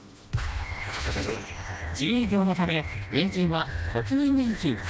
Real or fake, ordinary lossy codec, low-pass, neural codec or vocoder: fake; none; none; codec, 16 kHz, 1 kbps, FreqCodec, smaller model